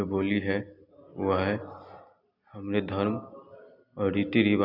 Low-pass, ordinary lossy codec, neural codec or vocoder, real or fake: 5.4 kHz; none; none; real